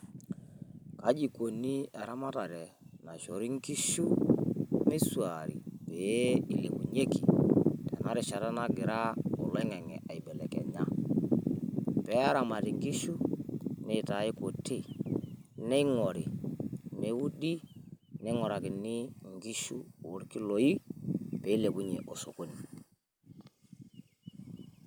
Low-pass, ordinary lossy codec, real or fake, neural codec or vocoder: none; none; real; none